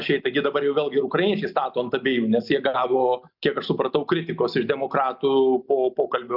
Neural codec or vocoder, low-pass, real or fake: none; 5.4 kHz; real